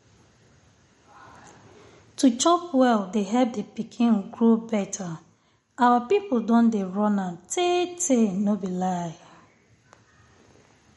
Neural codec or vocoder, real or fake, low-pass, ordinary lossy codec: none; real; 19.8 kHz; MP3, 48 kbps